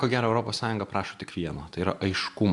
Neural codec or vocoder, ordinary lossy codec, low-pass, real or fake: none; AAC, 64 kbps; 10.8 kHz; real